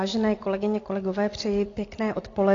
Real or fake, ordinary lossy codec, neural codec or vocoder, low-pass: real; AAC, 32 kbps; none; 7.2 kHz